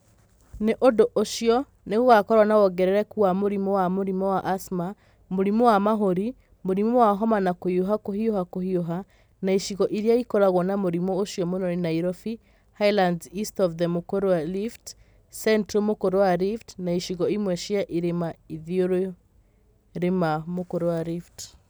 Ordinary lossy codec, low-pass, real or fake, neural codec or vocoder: none; none; real; none